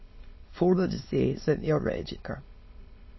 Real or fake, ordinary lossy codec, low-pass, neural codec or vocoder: fake; MP3, 24 kbps; 7.2 kHz; autoencoder, 22.05 kHz, a latent of 192 numbers a frame, VITS, trained on many speakers